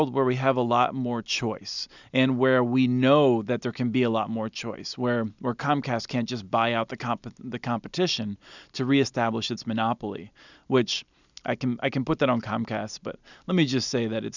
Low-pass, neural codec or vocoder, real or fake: 7.2 kHz; none; real